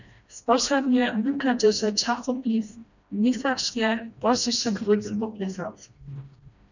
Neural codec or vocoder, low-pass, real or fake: codec, 16 kHz, 1 kbps, FreqCodec, smaller model; 7.2 kHz; fake